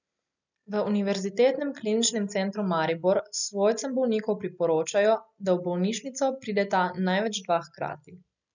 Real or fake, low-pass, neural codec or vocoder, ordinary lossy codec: real; 7.2 kHz; none; none